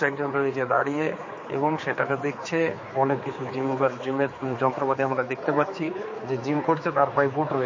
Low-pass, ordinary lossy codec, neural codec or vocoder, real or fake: 7.2 kHz; MP3, 32 kbps; codec, 16 kHz, 4 kbps, X-Codec, HuBERT features, trained on general audio; fake